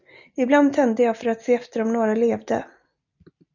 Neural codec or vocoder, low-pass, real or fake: none; 7.2 kHz; real